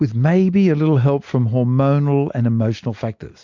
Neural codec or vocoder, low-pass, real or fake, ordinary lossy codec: none; 7.2 kHz; real; MP3, 48 kbps